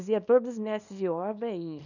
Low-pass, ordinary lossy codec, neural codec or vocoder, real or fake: 7.2 kHz; none; codec, 24 kHz, 0.9 kbps, WavTokenizer, small release; fake